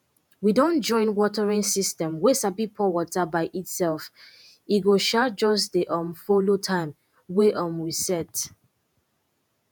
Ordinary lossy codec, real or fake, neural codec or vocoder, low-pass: none; fake; vocoder, 48 kHz, 128 mel bands, Vocos; none